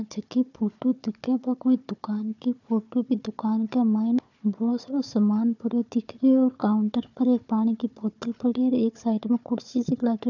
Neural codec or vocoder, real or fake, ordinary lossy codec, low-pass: codec, 16 kHz, 4 kbps, FunCodec, trained on Chinese and English, 50 frames a second; fake; none; 7.2 kHz